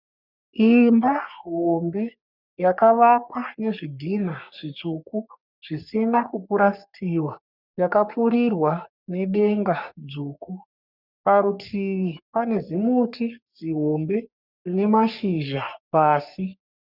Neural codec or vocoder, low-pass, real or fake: codec, 44.1 kHz, 3.4 kbps, Pupu-Codec; 5.4 kHz; fake